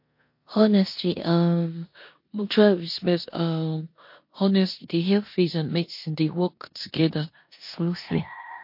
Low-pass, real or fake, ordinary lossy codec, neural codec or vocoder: 5.4 kHz; fake; MP3, 32 kbps; codec, 16 kHz in and 24 kHz out, 0.9 kbps, LongCat-Audio-Codec, four codebook decoder